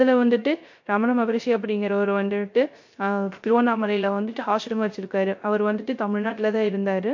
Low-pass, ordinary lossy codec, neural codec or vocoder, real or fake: 7.2 kHz; MP3, 48 kbps; codec, 16 kHz, 0.3 kbps, FocalCodec; fake